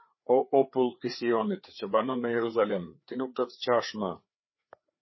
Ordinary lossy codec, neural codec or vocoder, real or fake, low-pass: MP3, 24 kbps; codec, 16 kHz, 4 kbps, FreqCodec, larger model; fake; 7.2 kHz